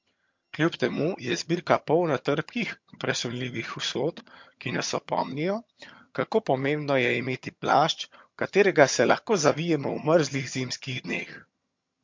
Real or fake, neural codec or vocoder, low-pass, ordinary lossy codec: fake; vocoder, 22.05 kHz, 80 mel bands, HiFi-GAN; 7.2 kHz; MP3, 48 kbps